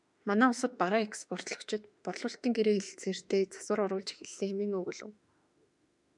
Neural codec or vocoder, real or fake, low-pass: autoencoder, 48 kHz, 32 numbers a frame, DAC-VAE, trained on Japanese speech; fake; 10.8 kHz